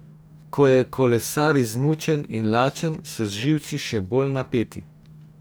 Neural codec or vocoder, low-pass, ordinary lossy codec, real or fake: codec, 44.1 kHz, 2.6 kbps, DAC; none; none; fake